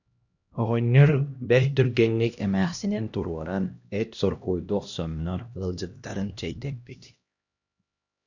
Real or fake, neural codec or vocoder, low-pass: fake; codec, 16 kHz, 1 kbps, X-Codec, HuBERT features, trained on LibriSpeech; 7.2 kHz